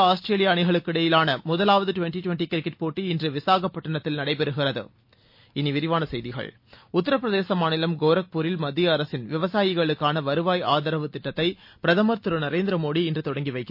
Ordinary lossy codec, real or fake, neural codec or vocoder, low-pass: MP3, 32 kbps; real; none; 5.4 kHz